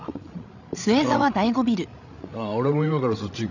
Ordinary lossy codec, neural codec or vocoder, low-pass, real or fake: none; codec, 16 kHz, 16 kbps, FreqCodec, larger model; 7.2 kHz; fake